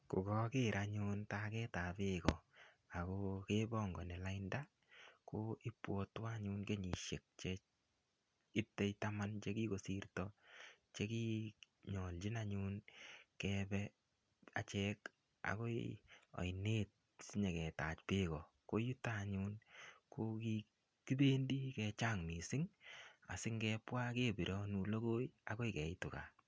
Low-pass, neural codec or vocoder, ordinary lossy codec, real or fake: none; none; none; real